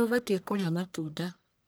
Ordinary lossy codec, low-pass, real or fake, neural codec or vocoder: none; none; fake; codec, 44.1 kHz, 1.7 kbps, Pupu-Codec